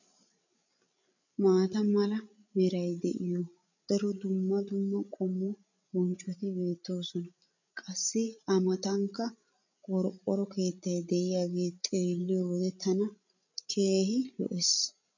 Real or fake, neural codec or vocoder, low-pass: fake; codec, 16 kHz, 16 kbps, FreqCodec, larger model; 7.2 kHz